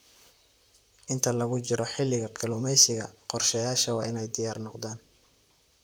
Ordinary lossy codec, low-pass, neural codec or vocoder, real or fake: none; none; vocoder, 44.1 kHz, 128 mel bands, Pupu-Vocoder; fake